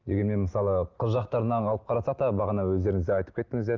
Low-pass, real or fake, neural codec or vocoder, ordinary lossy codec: 7.2 kHz; real; none; Opus, 32 kbps